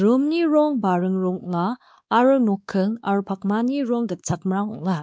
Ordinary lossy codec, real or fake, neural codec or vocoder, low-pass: none; fake; codec, 16 kHz, 2 kbps, X-Codec, WavLM features, trained on Multilingual LibriSpeech; none